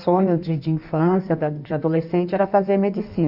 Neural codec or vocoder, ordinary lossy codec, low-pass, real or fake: codec, 16 kHz in and 24 kHz out, 1.1 kbps, FireRedTTS-2 codec; none; 5.4 kHz; fake